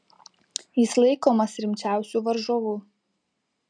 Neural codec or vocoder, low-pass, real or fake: none; 9.9 kHz; real